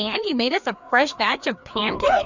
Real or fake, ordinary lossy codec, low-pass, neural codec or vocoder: fake; Opus, 64 kbps; 7.2 kHz; codec, 16 kHz, 2 kbps, FreqCodec, larger model